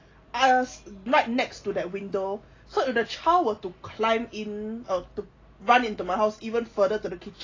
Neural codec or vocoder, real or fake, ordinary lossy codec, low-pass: none; real; AAC, 32 kbps; 7.2 kHz